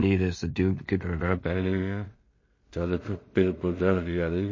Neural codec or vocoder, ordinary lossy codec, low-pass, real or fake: codec, 16 kHz in and 24 kHz out, 0.4 kbps, LongCat-Audio-Codec, two codebook decoder; MP3, 32 kbps; 7.2 kHz; fake